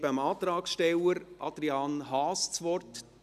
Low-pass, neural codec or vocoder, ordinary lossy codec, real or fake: 14.4 kHz; none; none; real